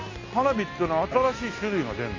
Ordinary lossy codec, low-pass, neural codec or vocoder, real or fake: AAC, 32 kbps; 7.2 kHz; none; real